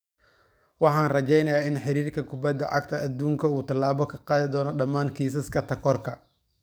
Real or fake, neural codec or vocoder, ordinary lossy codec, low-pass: fake; codec, 44.1 kHz, 7.8 kbps, DAC; none; none